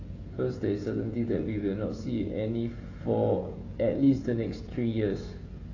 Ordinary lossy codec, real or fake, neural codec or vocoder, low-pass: AAC, 32 kbps; fake; codec, 16 kHz, 16 kbps, FreqCodec, smaller model; 7.2 kHz